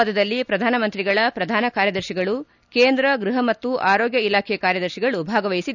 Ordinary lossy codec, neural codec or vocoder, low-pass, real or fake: none; none; 7.2 kHz; real